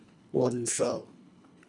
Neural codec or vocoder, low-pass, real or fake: codec, 24 kHz, 1.5 kbps, HILCodec; 10.8 kHz; fake